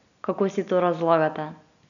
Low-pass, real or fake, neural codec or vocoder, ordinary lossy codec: 7.2 kHz; real; none; none